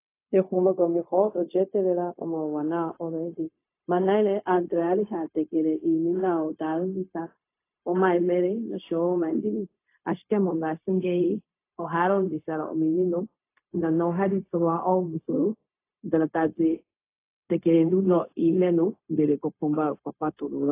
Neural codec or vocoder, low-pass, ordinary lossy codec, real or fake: codec, 16 kHz, 0.4 kbps, LongCat-Audio-Codec; 3.6 kHz; AAC, 24 kbps; fake